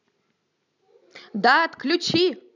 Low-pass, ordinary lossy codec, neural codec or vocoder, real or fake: 7.2 kHz; none; none; real